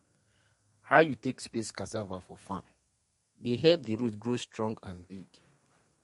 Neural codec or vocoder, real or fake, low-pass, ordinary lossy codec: codec, 32 kHz, 1.9 kbps, SNAC; fake; 14.4 kHz; MP3, 48 kbps